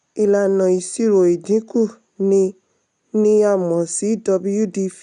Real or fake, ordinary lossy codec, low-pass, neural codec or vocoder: real; none; 10.8 kHz; none